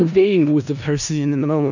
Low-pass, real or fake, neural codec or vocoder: 7.2 kHz; fake; codec, 16 kHz in and 24 kHz out, 0.4 kbps, LongCat-Audio-Codec, four codebook decoder